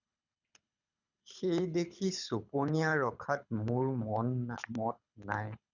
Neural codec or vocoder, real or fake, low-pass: codec, 24 kHz, 6 kbps, HILCodec; fake; 7.2 kHz